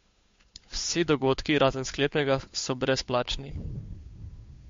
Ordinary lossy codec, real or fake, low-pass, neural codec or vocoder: MP3, 48 kbps; fake; 7.2 kHz; codec, 16 kHz, 2 kbps, FunCodec, trained on Chinese and English, 25 frames a second